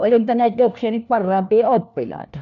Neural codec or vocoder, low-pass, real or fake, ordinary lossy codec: codec, 16 kHz, 1 kbps, FunCodec, trained on LibriTTS, 50 frames a second; 7.2 kHz; fake; none